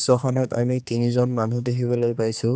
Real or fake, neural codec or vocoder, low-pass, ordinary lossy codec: fake; codec, 16 kHz, 2 kbps, X-Codec, HuBERT features, trained on general audio; none; none